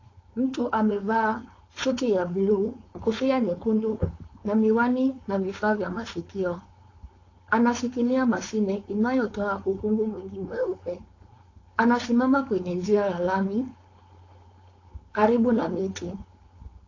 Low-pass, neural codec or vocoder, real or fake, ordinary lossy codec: 7.2 kHz; codec, 16 kHz, 4.8 kbps, FACodec; fake; AAC, 32 kbps